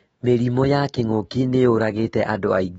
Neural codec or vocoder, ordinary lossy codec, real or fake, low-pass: autoencoder, 48 kHz, 128 numbers a frame, DAC-VAE, trained on Japanese speech; AAC, 24 kbps; fake; 19.8 kHz